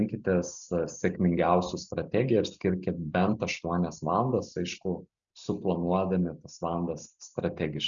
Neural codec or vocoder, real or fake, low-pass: none; real; 7.2 kHz